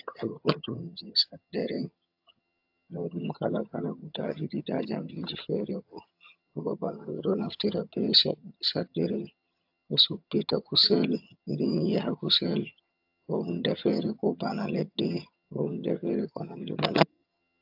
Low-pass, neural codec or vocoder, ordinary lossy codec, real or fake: 5.4 kHz; vocoder, 22.05 kHz, 80 mel bands, HiFi-GAN; AAC, 48 kbps; fake